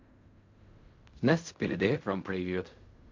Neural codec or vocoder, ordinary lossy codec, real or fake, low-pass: codec, 16 kHz in and 24 kHz out, 0.4 kbps, LongCat-Audio-Codec, fine tuned four codebook decoder; MP3, 48 kbps; fake; 7.2 kHz